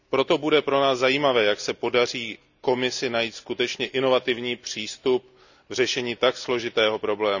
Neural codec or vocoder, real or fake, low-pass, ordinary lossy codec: none; real; 7.2 kHz; none